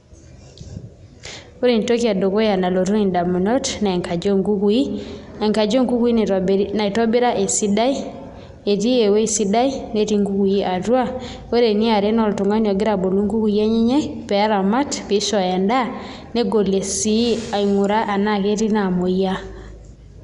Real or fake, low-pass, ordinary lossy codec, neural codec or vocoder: real; 10.8 kHz; none; none